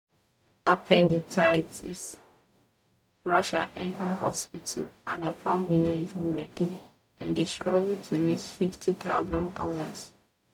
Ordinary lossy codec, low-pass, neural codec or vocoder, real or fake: none; 19.8 kHz; codec, 44.1 kHz, 0.9 kbps, DAC; fake